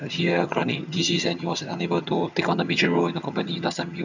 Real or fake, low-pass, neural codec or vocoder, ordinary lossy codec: fake; 7.2 kHz; vocoder, 22.05 kHz, 80 mel bands, HiFi-GAN; none